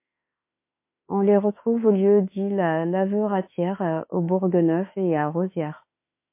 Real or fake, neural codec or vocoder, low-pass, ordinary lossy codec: fake; autoencoder, 48 kHz, 32 numbers a frame, DAC-VAE, trained on Japanese speech; 3.6 kHz; MP3, 24 kbps